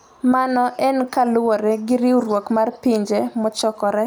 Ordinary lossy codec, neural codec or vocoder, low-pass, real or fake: none; none; none; real